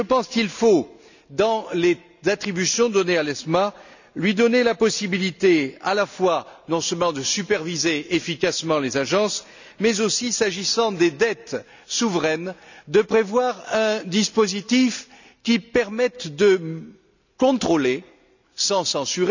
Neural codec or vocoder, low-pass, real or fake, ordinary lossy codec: none; 7.2 kHz; real; none